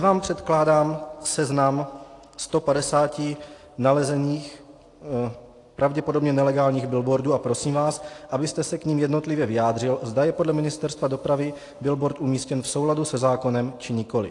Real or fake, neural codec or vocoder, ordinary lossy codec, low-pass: real; none; AAC, 48 kbps; 10.8 kHz